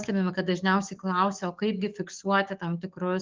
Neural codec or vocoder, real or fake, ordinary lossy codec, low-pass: codec, 44.1 kHz, 7.8 kbps, DAC; fake; Opus, 32 kbps; 7.2 kHz